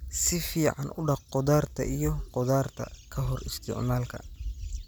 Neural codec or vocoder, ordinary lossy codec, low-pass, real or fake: none; none; none; real